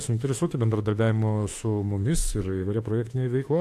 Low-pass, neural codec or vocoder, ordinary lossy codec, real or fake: 14.4 kHz; autoencoder, 48 kHz, 32 numbers a frame, DAC-VAE, trained on Japanese speech; AAC, 64 kbps; fake